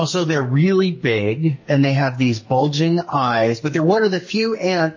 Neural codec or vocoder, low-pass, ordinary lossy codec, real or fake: codec, 44.1 kHz, 2.6 kbps, SNAC; 7.2 kHz; MP3, 32 kbps; fake